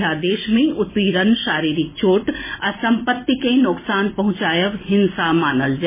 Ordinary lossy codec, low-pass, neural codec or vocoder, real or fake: MP3, 16 kbps; 3.6 kHz; none; real